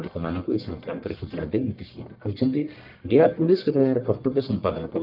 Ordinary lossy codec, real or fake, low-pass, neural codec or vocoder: Opus, 24 kbps; fake; 5.4 kHz; codec, 44.1 kHz, 1.7 kbps, Pupu-Codec